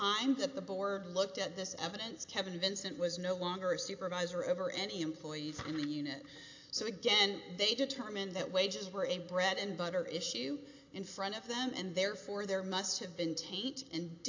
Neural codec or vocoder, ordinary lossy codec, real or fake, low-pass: none; AAC, 48 kbps; real; 7.2 kHz